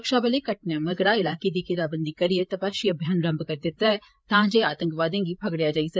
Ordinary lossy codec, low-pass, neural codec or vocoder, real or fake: none; none; codec, 16 kHz, 8 kbps, FreqCodec, larger model; fake